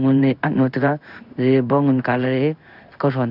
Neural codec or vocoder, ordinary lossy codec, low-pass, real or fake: codec, 16 kHz in and 24 kHz out, 1 kbps, XY-Tokenizer; none; 5.4 kHz; fake